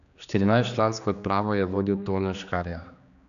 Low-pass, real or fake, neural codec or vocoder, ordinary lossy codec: 7.2 kHz; fake; codec, 16 kHz, 2 kbps, X-Codec, HuBERT features, trained on general audio; none